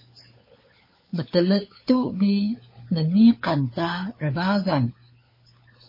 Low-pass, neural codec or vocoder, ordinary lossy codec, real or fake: 5.4 kHz; codec, 16 kHz, 4 kbps, FunCodec, trained on LibriTTS, 50 frames a second; MP3, 24 kbps; fake